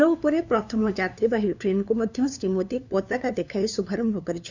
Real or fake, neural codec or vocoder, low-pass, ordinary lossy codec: fake; codec, 16 kHz, 2 kbps, FunCodec, trained on LibriTTS, 25 frames a second; 7.2 kHz; none